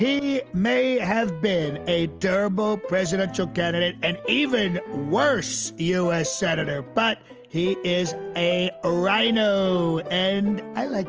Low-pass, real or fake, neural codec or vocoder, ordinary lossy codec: 7.2 kHz; real; none; Opus, 24 kbps